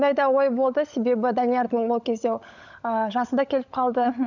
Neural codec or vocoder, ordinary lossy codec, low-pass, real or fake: codec, 16 kHz, 16 kbps, FunCodec, trained on LibriTTS, 50 frames a second; none; 7.2 kHz; fake